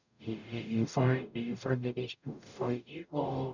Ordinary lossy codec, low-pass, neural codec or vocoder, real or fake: none; 7.2 kHz; codec, 44.1 kHz, 0.9 kbps, DAC; fake